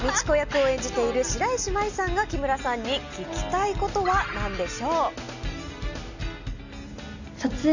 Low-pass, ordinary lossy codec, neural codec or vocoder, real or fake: 7.2 kHz; none; none; real